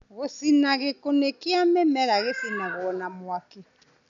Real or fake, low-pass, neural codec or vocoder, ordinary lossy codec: real; 7.2 kHz; none; none